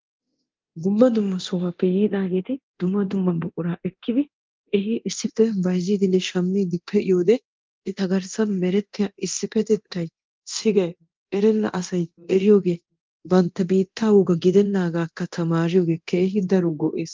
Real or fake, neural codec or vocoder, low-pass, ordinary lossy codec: fake; codec, 24 kHz, 0.9 kbps, DualCodec; 7.2 kHz; Opus, 32 kbps